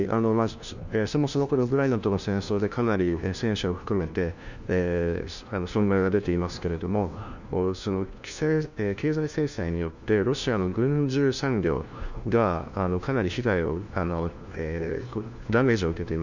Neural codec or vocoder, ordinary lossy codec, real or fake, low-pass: codec, 16 kHz, 1 kbps, FunCodec, trained on LibriTTS, 50 frames a second; none; fake; 7.2 kHz